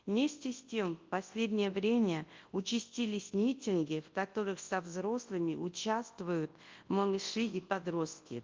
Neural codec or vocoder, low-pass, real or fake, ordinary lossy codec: codec, 24 kHz, 0.9 kbps, WavTokenizer, large speech release; 7.2 kHz; fake; Opus, 32 kbps